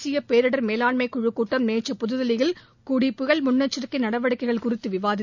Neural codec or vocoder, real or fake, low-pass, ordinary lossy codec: none; real; 7.2 kHz; none